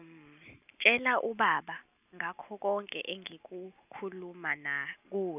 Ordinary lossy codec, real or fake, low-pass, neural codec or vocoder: none; real; 3.6 kHz; none